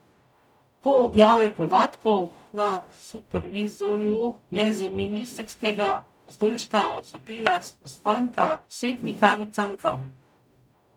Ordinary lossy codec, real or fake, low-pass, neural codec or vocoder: none; fake; 19.8 kHz; codec, 44.1 kHz, 0.9 kbps, DAC